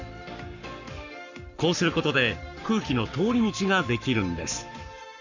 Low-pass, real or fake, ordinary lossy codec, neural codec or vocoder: 7.2 kHz; fake; none; codec, 44.1 kHz, 7.8 kbps, Pupu-Codec